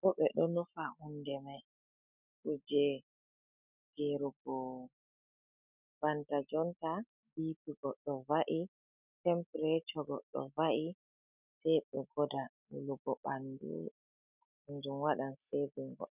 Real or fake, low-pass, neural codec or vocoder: real; 3.6 kHz; none